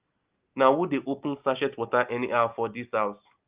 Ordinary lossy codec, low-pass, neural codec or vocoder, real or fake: Opus, 24 kbps; 3.6 kHz; none; real